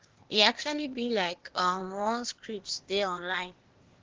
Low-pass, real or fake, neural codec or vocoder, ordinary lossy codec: 7.2 kHz; fake; codec, 16 kHz, 0.8 kbps, ZipCodec; Opus, 16 kbps